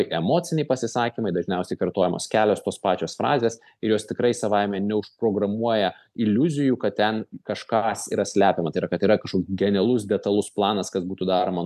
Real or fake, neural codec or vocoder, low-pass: real; none; 14.4 kHz